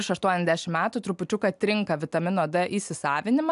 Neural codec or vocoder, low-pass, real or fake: none; 10.8 kHz; real